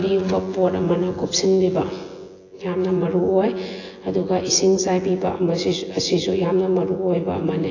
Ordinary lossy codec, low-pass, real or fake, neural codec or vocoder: AAC, 32 kbps; 7.2 kHz; fake; vocoder, 24 kHz, 100 mel bands, Vocos